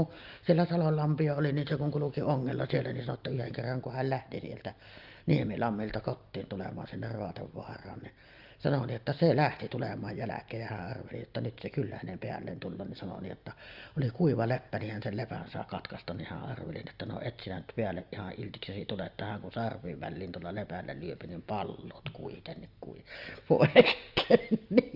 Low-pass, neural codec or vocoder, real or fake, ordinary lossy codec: 5.4 kHz; none; real; Opus, 32 kbps